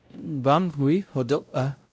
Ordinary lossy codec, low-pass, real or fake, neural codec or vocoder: none; none; fake; codec, 16 kHz, 0.5 kbps, X-Codec, WavLM features, trained on Multilingual LibriSpeech